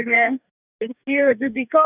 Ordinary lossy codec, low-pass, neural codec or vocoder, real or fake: none; 3.6 kHz; codec, 44.1 kHz, 2.6 kbps, DAC; fake